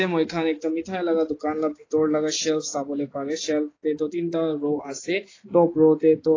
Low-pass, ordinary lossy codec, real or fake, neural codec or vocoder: 7.2 kHz; AAC, 32 kbps; real; none